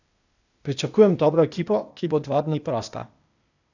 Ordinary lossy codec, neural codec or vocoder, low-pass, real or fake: none; codec, 16 kHz, 0.8 kbps, ZipCodec; 7.2 kHz; fake